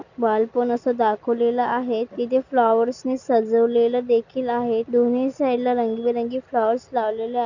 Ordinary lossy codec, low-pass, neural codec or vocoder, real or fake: none; 7.2 kHz; none; real